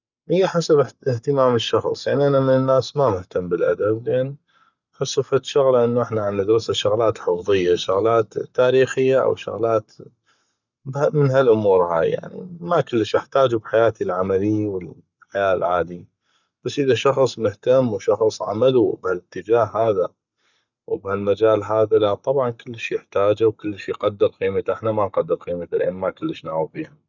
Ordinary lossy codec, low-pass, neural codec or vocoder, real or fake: none; 7.2 kHz; codec, 44.1 kHz, 7.8 kbps, Pupu-Codec; fake